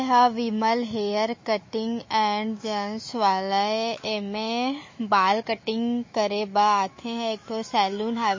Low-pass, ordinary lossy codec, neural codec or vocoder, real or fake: 7.2 kHz; MP3, 32 kbps; autoencoder, 48 kHz, 128 numbers a frame, DAC-VAE, trained on Japanese speech; fake